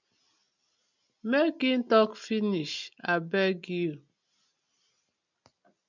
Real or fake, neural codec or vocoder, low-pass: real; none; 7.2 kHz